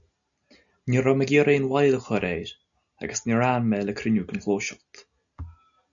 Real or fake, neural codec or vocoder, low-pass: real; none; 7.2 kHz